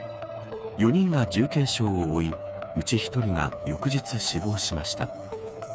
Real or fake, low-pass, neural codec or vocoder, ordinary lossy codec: fake; none; codec, 16 kHz, 4 kbps, FreqCodec, smaller model; none